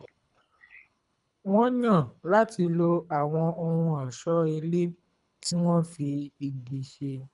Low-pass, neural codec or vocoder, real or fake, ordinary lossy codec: 10.8 kHz; codec, 24 kHz, 3 kbps, HILCodec; fake; none